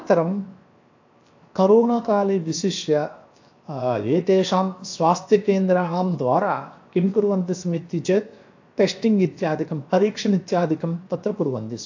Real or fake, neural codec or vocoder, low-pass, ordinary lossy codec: fake; codec, 16 kHz, 0.7 kbps, FocalCodec; 7.2 kHz; AAC, 48 kbps